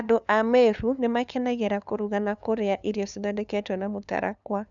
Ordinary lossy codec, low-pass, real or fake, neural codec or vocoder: none; 7.2 kHz; fake; codec, 16 kHz, 2 kbps, FunCodec, trained on LibriTTS, 25 frames a second